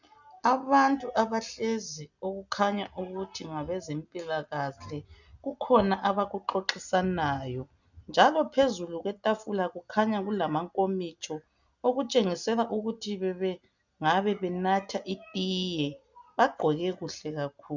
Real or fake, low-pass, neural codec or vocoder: real; 7.2 kHz; none